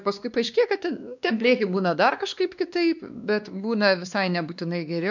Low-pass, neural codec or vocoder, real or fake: 7.2 kHz; codec, 16 kHz, 2 kbps, X-Codec, WavLM features, trained on Multilingual LibriSpeech; fake